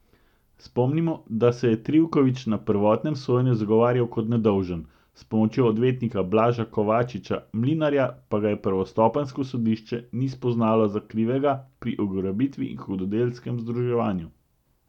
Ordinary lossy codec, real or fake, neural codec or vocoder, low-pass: none; real; none; 19.8 kHz